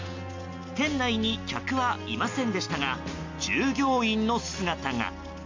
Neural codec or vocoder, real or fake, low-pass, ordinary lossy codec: none; real; 7.2 kHz; MP3, 48 kbps